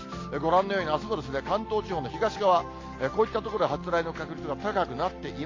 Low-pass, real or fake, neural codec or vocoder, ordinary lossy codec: 7.2 kHz; real; none; AAC, 32 kbps